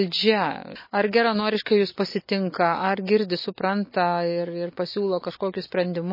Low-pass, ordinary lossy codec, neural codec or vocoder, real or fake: 5.4 kHz; MP3, 24 kbps; none; real